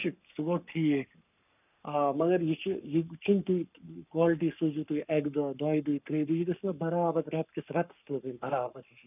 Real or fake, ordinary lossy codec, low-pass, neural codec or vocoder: fake; none; 3.6 kHz; codec, 44.1 kHz, 7.8 kbps, Pupu-Codec